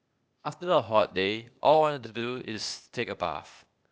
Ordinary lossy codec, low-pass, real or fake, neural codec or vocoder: none; none; fake; codec, 16 kHz, 0.8 kbps, ZipCodec